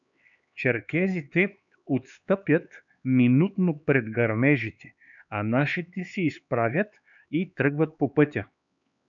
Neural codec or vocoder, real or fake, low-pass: codec, 16 kHz, 4 kbps, X-Codec, HuBERT features, trained on LibriSpeech; fake; 7.2 kHz